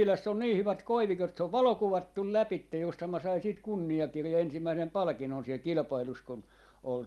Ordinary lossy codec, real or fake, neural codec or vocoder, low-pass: Opus, 32 kbps; real; none; 19.8 kHz